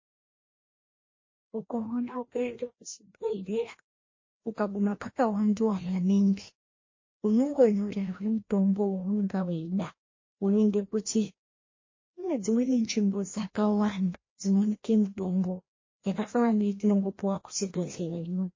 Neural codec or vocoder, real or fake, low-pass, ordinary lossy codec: codec, 16 kHz, 1 kbps, FreqCodec, larger model; fake; 7.2 kHz; MP3, 32 kbps